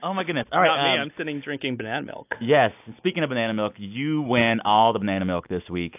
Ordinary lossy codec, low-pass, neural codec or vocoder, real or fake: AAC, 32 kbps; 3.6 kHz; none; real